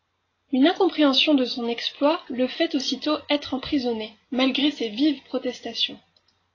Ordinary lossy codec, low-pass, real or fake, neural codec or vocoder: AAC, 32 kbps; 7.2 kHz; real; none